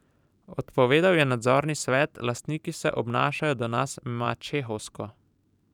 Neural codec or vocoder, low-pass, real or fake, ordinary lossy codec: vocoder, 44.1 kHz, 128 mel bands every 512 samples, BigVGAN v2; 19.8 kHz; fake; none